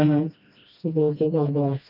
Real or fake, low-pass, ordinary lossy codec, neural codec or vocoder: fake; 5.4 kHz; none; codec, 16 kHz, 1 kbps, FreqCodec, smaller model